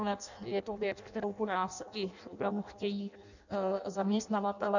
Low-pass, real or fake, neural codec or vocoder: 7.2 kHz; fake; codec, 16 kHz in and 24 kHz out, 0.6 kbps, FireRedTTS-2 codec